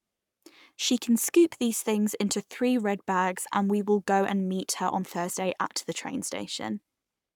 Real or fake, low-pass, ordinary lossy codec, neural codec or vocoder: fake; 19.8 kHz; none; codec, 44.1 kHz, 7.8 kbps, Pupu-Codec